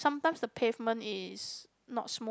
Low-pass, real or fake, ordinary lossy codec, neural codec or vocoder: none; real; none; none